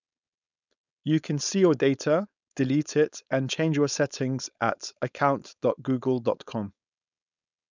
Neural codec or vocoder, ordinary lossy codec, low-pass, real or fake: codec, 16 kHz, 4.8 kbps, FACodec; none; 7.2 kHz; fake